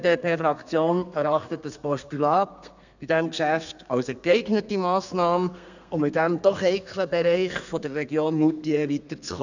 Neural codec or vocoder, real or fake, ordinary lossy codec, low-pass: codec, 32 kHz, 1.9 kbps, SNAC; fake; none; 7.2 kHz